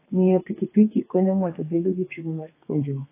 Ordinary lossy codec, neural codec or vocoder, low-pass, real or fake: AAC, 24 kbps; codec, 32 kHz, 1.9 kbps, SNAC; 3.6 kHz; fake